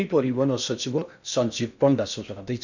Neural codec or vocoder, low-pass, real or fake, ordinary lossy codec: codec, 16 kHz in and 24 kHz out, 0.6 kbps, FocalCodec, streaming, 2048 codes; 7.2 kHz; fake; none